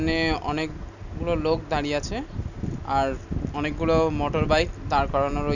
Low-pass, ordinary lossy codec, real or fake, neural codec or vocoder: 7.2 kHz; none; real; none